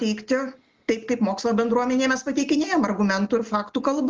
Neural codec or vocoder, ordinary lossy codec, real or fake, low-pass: none; Opus, 24 kbps; real; 7.2 kHz